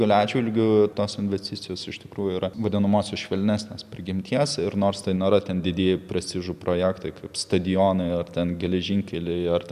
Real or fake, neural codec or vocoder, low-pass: real; none; 14.4 kHz